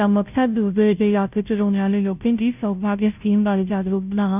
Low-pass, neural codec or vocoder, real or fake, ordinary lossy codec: 3.6 kHz; codec, 16 kHz, 0.5 kbps, FunCodec, trained on Chinese and English, 25 frames a second; fake; none